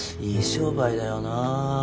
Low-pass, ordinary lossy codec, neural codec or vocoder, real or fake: none; none; none; real